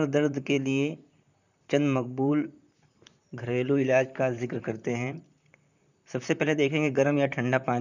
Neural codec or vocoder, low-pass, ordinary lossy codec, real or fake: vocoder, 44.1 kHz, 128 mel bands, Pupu-Vocoder; 7.2 kHz; none; fake